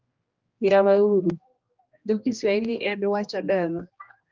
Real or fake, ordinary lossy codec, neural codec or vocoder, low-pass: fake; Opus, 32 kbps; codec, 16 kHz, 1 kbps, X-Codec, HuBERT features, trained on general audio; 7.2 kHz